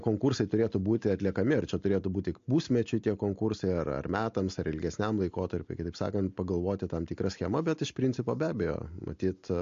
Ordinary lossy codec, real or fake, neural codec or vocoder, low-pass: MP3, 48 kbps; real; none; 7.2 kHz